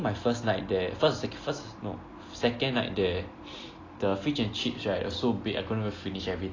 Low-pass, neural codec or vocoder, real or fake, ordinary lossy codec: 7.2 kHz; none; real; AAC, 32 kbps